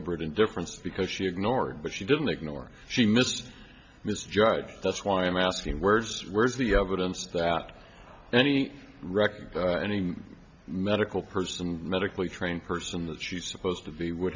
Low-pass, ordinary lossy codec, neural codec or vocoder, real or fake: 7.2 kHz; MP3, 64 kbps; none; real